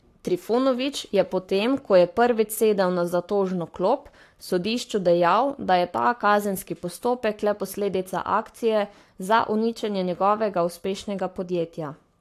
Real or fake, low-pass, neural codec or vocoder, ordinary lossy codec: fake; 14.4 kHz; codec, 44.1 kHz, 7.8 kbps, Pupu-Codec; AAC, 64 kbps